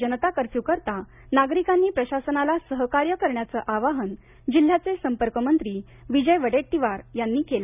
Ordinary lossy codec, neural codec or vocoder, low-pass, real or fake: none; none; 3.6 kHz; real